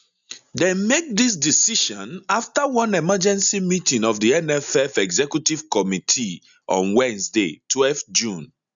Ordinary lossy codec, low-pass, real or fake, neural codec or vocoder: none; 7.2 kHz; real; none